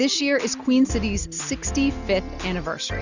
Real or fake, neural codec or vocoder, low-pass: real; none; 7.2 kHz